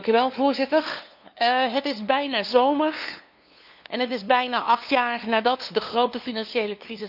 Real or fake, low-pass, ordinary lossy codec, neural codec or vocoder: fake; 5.4 kHz; none; codec, 16 kHz, 2 kbps, FunCodec, trained on LibriTTS, 25 frames a second